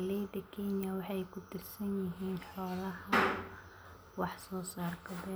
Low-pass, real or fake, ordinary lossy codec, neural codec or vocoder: none; real; none; none